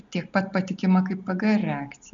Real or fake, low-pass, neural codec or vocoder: real; 7.2 kHz; none